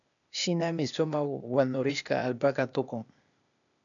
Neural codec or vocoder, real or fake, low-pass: codec, 16 kHz, 0.8 kbps, ZipCodec; fake; 7.2 kHz